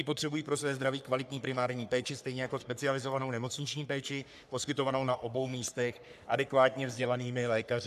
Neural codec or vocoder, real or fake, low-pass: codec, 44.1 kHz, 3.4 kbps, Pupu-Codec; fake; 14.4 kHz